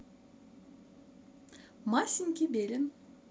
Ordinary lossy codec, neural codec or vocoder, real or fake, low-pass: none; none; real; none